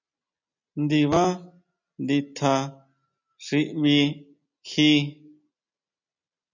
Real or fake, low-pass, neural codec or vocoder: real; 7.2 kHz; none